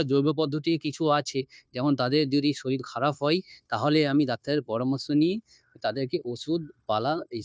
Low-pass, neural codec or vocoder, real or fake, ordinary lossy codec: none; codec, 16 kHz, 0.9 kbps, LongCat-Audio-Codec; fake; none